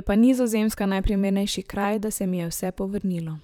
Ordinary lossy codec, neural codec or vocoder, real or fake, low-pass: none; vocoder, 44.1 kHz, 128 mel bands, Pupu-Vocoder; fake; 19.8 kHz